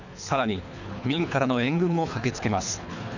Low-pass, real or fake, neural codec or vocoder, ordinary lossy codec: 7.2 kHz; fake; codec, 24 kHz, 3 kbps, HILCodec; none